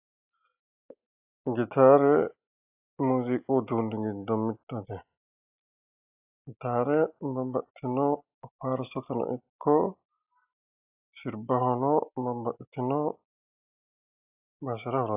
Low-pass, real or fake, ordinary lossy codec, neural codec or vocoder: 3.6 kHz; real; AAC, 32 kbps; none